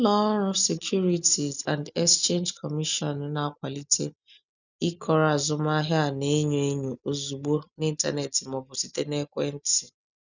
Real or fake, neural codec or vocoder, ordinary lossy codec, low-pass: real; none; none; 7.2 kHz